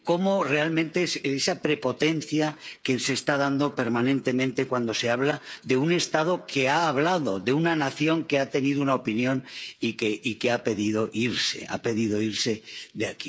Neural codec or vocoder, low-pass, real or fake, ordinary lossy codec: codec, 16 kHz, 8 kbps, FreqCodec, smaller model; none; fake; none